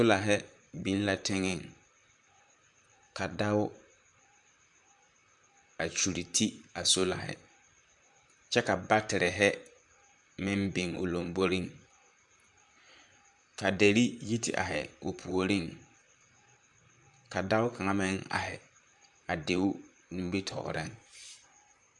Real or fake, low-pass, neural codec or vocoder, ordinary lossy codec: fake; 10.8 kHz; vocoder, 44.1 kHz, 128 mel bands, Pupu-Vocoder; MP3, 96 kbps